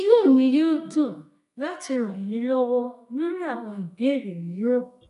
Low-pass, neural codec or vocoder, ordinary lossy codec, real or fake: 10.8 kHz; codec, 24 kHz, 0.9 kbps, WavTokenizer, medium music audio release; none; fake